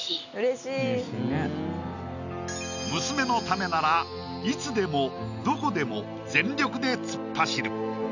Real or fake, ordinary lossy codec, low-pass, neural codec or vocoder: real; none; 7.2 kHz; none